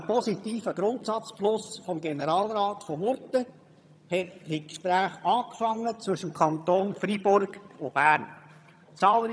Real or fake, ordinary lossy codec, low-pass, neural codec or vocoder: fake; none; none; vocoder, 22.05 kHz, 80 mel bands, HiFi-GAN